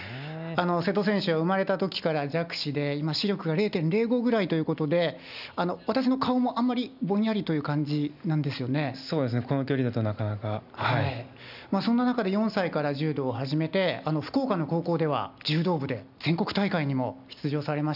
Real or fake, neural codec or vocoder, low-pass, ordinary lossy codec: real; none; 5.4 kHz; none